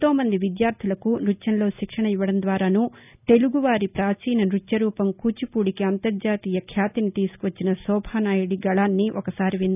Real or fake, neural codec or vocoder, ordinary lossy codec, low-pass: real; none; none; 3.6 kHz